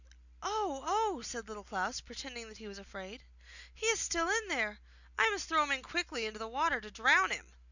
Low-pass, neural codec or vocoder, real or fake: 7.2 kHz; none; real